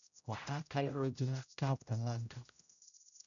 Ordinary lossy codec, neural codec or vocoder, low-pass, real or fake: none; codec, 16 kHz, 0.5 kbps, X-Codec, HuBERT features, trained on general audio; 7.2 kHz; fake